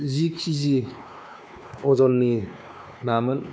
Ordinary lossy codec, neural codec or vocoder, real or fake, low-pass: none; codec, 16 kHz, 2 kbps, X-Codec, WavLM features, trained on Multilingual LibriSpeech; fake; none